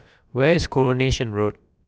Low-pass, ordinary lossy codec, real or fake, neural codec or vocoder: none; none; fake; codec, 16 kHz, about 1 kbps, DyCAST, with the encoder's durations